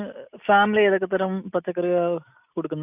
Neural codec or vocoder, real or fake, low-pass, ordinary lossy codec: none; real; 3.6 kHz; none